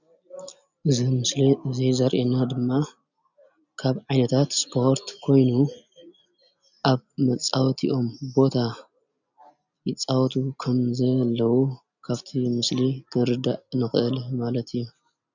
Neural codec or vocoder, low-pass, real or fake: none; 7.2 kHz; real